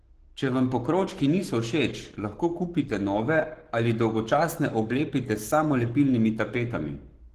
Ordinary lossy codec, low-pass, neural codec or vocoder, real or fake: Opus, 16 kbps; 14.4 kHz; codec, 44.1 kHz, 7.8 kbps, Pupu-Codec; fake